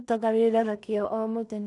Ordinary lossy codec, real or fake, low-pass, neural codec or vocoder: none; fake; 10.8 kHz; codec, 16 kHz in and 24 kHz out, 0.4 kbps, LongCat-Audio-Codec, two codebook decoder